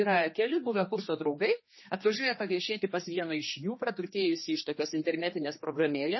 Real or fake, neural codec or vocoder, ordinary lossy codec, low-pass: fake; codec, 16 kHz, 2 kbps, X-Codec, HuBERT features, trained on general audio; MP3, 24 kbps; 7.2 kHz